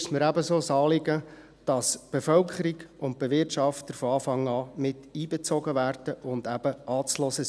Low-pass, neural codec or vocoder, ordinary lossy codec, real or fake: none; none; none; real